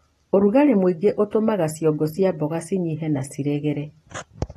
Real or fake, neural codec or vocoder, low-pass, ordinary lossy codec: real; none; 19.8 kHz; AAC, 32 kbps